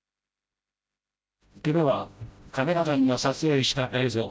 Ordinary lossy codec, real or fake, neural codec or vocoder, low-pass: none; fake; codec, 16 kHz, 0.5 kbps, FreqCodec, smaller model; none